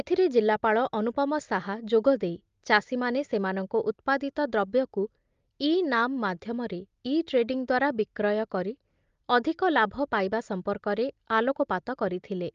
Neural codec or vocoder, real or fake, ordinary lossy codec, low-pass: none; real; Opus, 32 kbps; 7.2 kHz